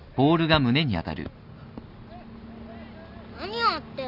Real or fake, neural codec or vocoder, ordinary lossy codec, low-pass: real; none; none; 5.4 kHz